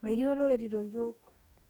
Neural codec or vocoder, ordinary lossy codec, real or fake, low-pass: codec, 44.1 kHz, 2.6 kbps, DAC; none; fake; 19.8 kHz